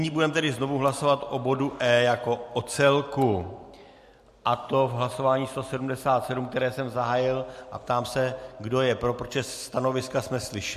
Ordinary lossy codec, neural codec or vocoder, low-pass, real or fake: MP3, 64 kbps; none; 14.4 kHz; real